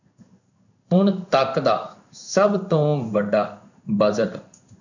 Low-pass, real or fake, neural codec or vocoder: 7.2 kHz; fake; codec, 16 kHz in and 24 kHz out, 1 kbps, XY-Tokenizer